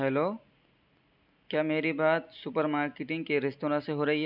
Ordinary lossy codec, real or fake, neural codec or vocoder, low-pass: none; real; none; 5.4 kHz